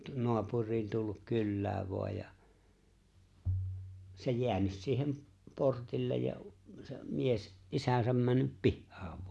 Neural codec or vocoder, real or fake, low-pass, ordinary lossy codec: none; real; none; none